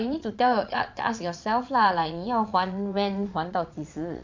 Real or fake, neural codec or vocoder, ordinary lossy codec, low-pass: fake; vocoder, 22.05 kHz, 80 mel bands, Vocos; MP3, 64 kbps; 7.2 kHz